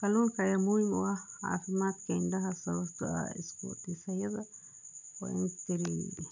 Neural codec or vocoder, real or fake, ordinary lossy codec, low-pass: none; real; none; 7.2 kHz